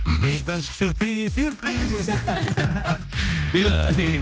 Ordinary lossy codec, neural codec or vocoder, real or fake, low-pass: none; codec, 16 kHz, 1 kbps, X-Codec, HuBERT features, trained on general audio; fake; none